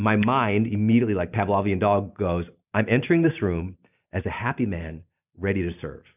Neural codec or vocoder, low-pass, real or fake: none; 3.6 kHz; real